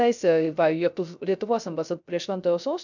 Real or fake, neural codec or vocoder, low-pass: fake; codec, 16 kHz, 0.3 kbps, FocalCodec; 7.2 kHz